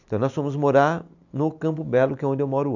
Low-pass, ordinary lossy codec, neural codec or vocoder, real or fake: 7.2 kHz; none; none; real